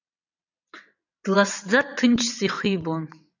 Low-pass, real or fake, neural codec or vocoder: 7.2 kHz; fake; vocoder, 22.05 kHz, 80 mel bands, WaveNeXt